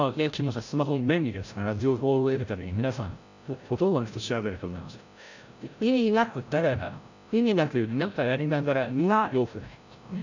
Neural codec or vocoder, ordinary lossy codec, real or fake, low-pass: codec, 16 kHz, 0.5 kbps, FreqCodec, larger model; none; fake; 7.2 kHz